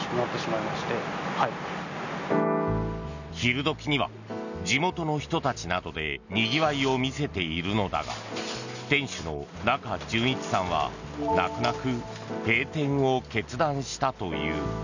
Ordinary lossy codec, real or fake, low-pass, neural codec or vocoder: none; real; 7.2 kHz; none